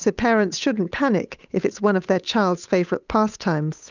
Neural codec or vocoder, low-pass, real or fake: codec, 16 kHz, 8 kbps, FunCodec, trained on Chinese and English, 25 frames a second; 7.2 kHz; fake